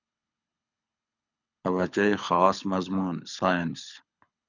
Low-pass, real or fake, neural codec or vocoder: 7.2 kHz; fake; codec, 24 kHz, 6 kbps, HILCodec